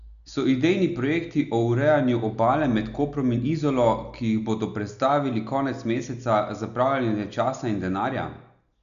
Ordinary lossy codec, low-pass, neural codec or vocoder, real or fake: none; 7.2 kHz; none; real